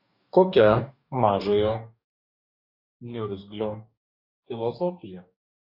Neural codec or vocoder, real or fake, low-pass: codec, 44.1 kHz, 2.6 kbps, DAC; fake; 5.4 kHz